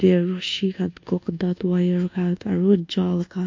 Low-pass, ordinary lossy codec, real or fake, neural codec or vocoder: 7.2 kHz; MP3, 48 kbps; fake; codec, 24 kHz, 1.2 kbps, DualCodec